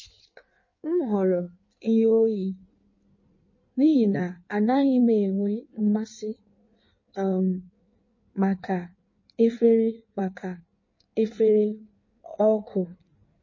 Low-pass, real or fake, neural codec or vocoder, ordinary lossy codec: 7.2 kHz; fake; codec, 16 kHz in and 24 kHz out, 1.1 kbps, FireRedTTS-2 codec; MP3, 32 kbps